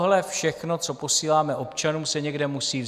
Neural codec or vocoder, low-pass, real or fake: none; 14.4 kHz; real